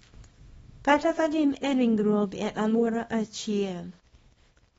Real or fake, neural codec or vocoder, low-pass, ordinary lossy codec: fake; codec, 24 kHz, 0.9 kbps, WavTokenizer, small release; 10.8 kHz; AAC, 24 kbps